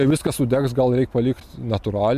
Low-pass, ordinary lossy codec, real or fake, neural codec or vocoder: 14.4 kHz; MP3, 96 kbps; real; none